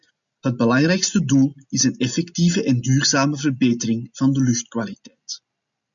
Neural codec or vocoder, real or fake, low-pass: none; real; 7.2 kHz